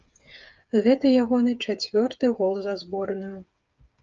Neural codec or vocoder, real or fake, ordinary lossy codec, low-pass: codec, 16 kHz, 8 kbps, FreqCodec, smaller model; fake; Opus, 24 kbps; 7.2 kHz